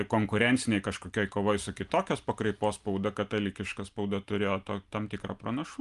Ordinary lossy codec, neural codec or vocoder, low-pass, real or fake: Opus, 32 kbps; none; 10.8 kHz; real